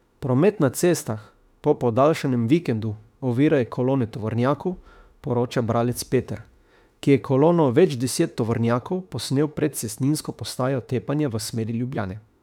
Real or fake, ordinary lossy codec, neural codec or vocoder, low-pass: fake; none; autoencoder, 48 kHz, 32 numbers a frame, DAC-VAE, trained on Japanese speech; 19.8 kHz